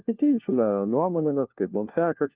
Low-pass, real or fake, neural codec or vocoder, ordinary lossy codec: 3.6 kHz; fake; codec, 16 kHz, 1 kbps, FunCodec, trained on LibriTTS, 50 frames a second; Opus, 32 kbps